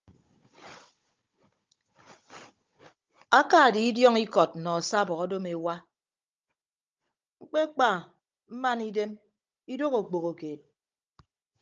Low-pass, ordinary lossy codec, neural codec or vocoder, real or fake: 7.2 kHz; Opus, 24 kbps; codec, 16 kHz, 16 kbps, FunCodec, trained on Chinese and English, 50 frames a second; fake